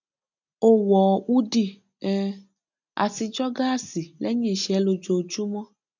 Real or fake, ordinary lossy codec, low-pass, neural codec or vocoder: real; none; 7.2 kHz; none